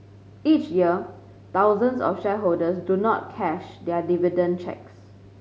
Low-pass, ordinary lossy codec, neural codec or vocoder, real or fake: none; none; none; real